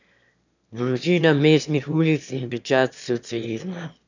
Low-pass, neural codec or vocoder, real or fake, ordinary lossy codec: 7.2 kHz; autoencoder, 22.05 kHz, a latent of 192 numbers a frame, VITS, trained on one speaker; fake; none